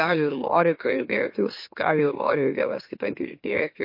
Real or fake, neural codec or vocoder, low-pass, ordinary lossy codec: fake; autoencoder, 44.1 kHz, a latent of 192 numbers a frame, MeloTTS; 5.4 kHz; MP3, 32 kbps